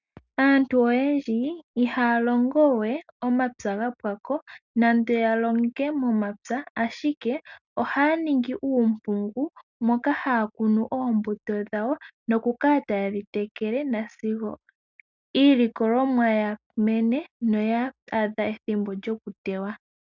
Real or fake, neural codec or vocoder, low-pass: real; none; 7.2 kHz